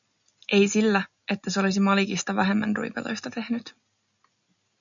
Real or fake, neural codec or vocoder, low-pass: real; none; 7.2 kHz